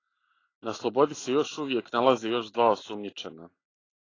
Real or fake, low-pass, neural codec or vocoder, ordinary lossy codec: real; 7.2 kHz; none; AAC, 32 kbps